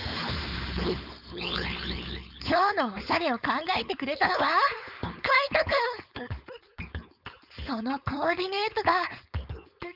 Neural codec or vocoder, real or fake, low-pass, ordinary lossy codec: codec, 16 kHz, 4.8 kbps, FACodec; fake; 5.4 kHz; none